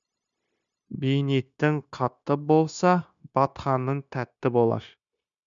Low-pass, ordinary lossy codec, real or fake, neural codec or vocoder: 7.2 kHz; MP3, 96 kbps; fake; codec, 16 kHz, 0.9 kbps, LongCat-Audio-Codec